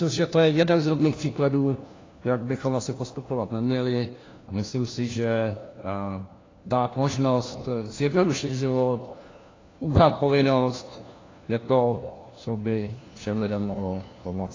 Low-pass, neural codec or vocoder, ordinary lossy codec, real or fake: 7.2 kHz; codec, 16 kHz, 1 kbps, FunCodec, trained on LibriTTS, 50 frames a second; AAC, 32 kbps; fake